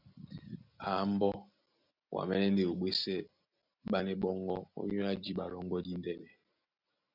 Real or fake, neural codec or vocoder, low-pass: real; none; 5.4 kHz